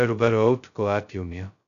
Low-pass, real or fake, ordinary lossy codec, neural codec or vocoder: 7.2 kHz; fake; none; codec, 16 kHz, 0.2 kbps, FocalCodec